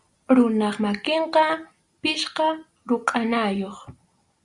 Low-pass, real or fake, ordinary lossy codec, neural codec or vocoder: 10.8 kHz; real; Opus, 64 kbps; none